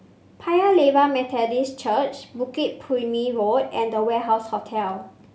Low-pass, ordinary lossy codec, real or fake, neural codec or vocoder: none; none; real; none